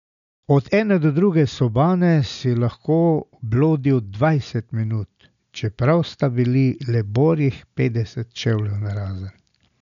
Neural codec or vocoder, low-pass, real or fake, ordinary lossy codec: none; 7.2 kHz; real; none